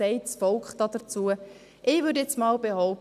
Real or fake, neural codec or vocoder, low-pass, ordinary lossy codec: real; none; 14.4 kHz; none